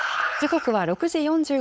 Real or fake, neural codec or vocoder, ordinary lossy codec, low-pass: fake; codec, 16 kHz, 4.8 kbps, FACodec; none; none